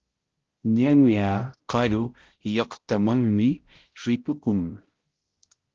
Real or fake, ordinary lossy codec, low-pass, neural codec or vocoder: fake; Opus, 16 kbps; 7.2 kHz; codec, 16 kHz, 0.5 kbps, X-Codec, HuBERT features, trained on balanced general audio